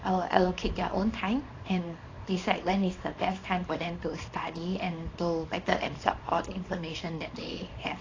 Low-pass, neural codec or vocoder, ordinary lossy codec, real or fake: 7.2 kHz; codec, 24 kHz, 0.9 kbps, WavTokenizer, small release; AAC, 32 kbps; fake